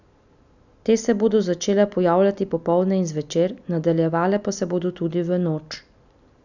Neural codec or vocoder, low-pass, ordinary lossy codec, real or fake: none; 7.2 kHz; none; real